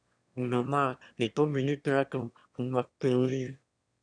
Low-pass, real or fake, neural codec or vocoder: 9.9 kHz; fake; autoencoder, 22.05 kHz, a latent of 192 numbers a frame, VITS, trained on one speaker